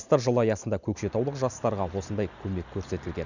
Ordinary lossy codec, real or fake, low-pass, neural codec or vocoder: none; real; 7.2 kHz; none